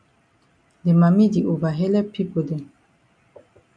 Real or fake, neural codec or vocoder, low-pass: real; none; 9.9 kHz